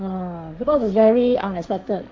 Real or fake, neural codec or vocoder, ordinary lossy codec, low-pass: fake; codec, 16 kHz, 1.1 kbps, Voila-Tokenizer; none; none